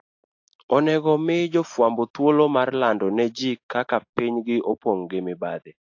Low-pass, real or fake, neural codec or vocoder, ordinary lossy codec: 7.2 kHz; real; none; AAC, 48 kbps